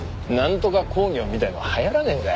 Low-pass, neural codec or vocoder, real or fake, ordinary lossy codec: none; none; real; none